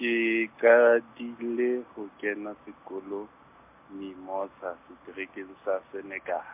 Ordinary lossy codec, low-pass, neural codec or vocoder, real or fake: MP3, 32 kbps; 3.6 kHz; none; real